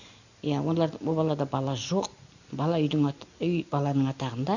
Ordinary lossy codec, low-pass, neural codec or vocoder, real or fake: none; 7.2 kHz; none; real